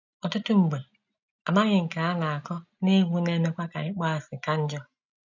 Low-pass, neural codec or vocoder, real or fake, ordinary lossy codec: 7.2 kHz; none; real; none